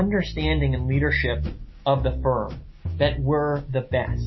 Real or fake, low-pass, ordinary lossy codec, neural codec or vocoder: real; 7.2 kHz; MP3, 24 kbps; none